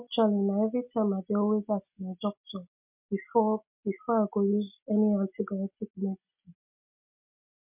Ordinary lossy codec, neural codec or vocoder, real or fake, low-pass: MP3, 32 kbps; none; real; 3.6 kHz